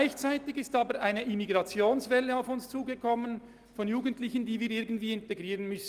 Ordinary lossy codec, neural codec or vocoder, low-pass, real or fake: Opus, 24 kbps; none; 14.4 kHz; real